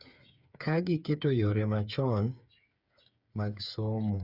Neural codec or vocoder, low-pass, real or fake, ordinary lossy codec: codec, 16 kHz, 8 kbps, FreqCodec, smaller model; 5.4 kHz; fake; Opus, 64 kbps